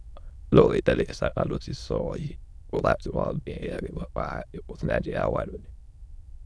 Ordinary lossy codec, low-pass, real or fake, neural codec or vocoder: none; none; fake; autoencoder, 22.05 kHz, a latent of 192 numbers a frame, VITS, trained on many speakers